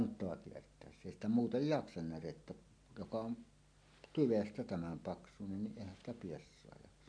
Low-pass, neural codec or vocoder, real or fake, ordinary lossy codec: 9.9 kHz; none; real; MP3, 64 kbps